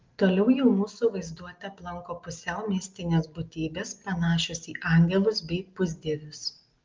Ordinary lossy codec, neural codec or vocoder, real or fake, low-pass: Opus, 32 kbps; none; real; 7.2 kHz